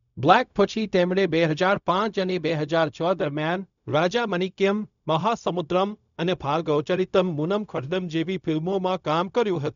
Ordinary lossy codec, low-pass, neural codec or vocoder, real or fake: none; 7.2 kHz; codec, 16 kHz, 0.4 kbps, LongCat-Audio-Codec; fake